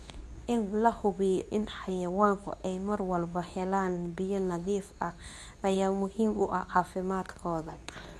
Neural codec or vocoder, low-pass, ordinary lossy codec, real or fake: codec, 24 kHz, 0.9 kbps, WavTokenizer, medium speech release version 2; none; none; fake